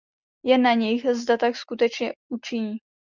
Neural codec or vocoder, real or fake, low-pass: none; real; 7.2 kHz